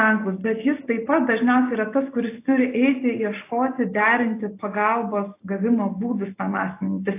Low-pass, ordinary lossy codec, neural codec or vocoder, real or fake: 3.6 kHz; AAC, 24 kbps; none; real